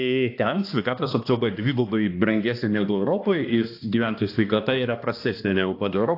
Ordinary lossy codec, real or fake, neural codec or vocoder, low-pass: AAC, 32 kbps; fake; codec, 16 kHz, 2 kbps, X-Codec, HuBERT features, trained on balanced general audio; 5.4 kHz